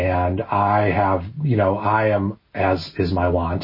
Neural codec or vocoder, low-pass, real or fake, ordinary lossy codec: none; 5.4 kHz; real; MP3, 24 kbps